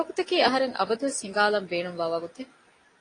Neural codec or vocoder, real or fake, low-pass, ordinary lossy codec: none; real; 9.9 kHz; AAC, 32 kbps